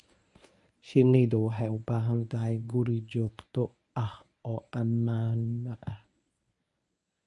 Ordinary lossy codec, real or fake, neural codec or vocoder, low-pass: none; fake; codec, 24 kHz, 0.9 kbps, WavTokenizer, medium speech release version 1; 10.8 kHz